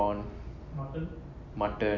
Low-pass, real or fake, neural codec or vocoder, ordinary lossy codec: 7.2 kHz; real; none; none